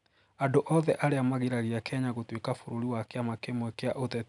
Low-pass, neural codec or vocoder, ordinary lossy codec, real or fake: 10.8 kHz; none; none; real